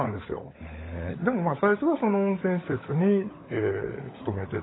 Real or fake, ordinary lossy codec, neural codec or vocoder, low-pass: fake; AAC, 16 kbps; codec, 16 kHz, 16 kbps, FunCodec, trained on LibriTTS, 50 frames a second; 7.2 kHz